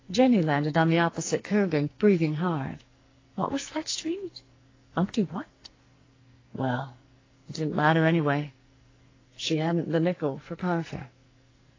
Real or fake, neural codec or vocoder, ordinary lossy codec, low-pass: fake; codec, 44.1 kHz, 2.6 kbps, SNAC; AAC, 32 kbps; 7.2 kHz